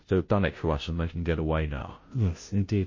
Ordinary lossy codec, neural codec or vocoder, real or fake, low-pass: MP3, 32 kbps; codec, 16 kHz, 0.5 kbps, FunCodec, trained on Chinese and English, 25 frames a second; fake; 7.2 kHz